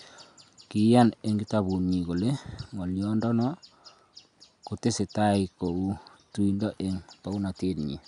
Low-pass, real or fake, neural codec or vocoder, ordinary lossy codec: 10.8 kHz; real; none; none